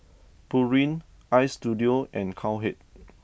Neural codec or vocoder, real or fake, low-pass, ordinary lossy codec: none; real; none; none